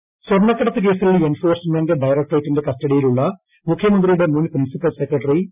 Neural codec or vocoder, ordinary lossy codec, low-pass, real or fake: none; none; 3.6 kHz; real